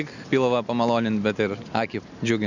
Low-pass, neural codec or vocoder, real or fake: 7.2 kHz; none; real